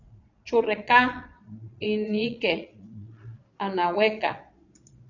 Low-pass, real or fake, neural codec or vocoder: 7.2 kHz; fake; vocoder, 22.05 kHz, 80 mel bands, Vocos